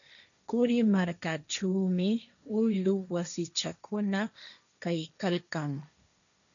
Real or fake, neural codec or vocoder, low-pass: fake; codec, 16 kHz, 1.1 kbps, Voila-Tokenizer; 7.2 kHz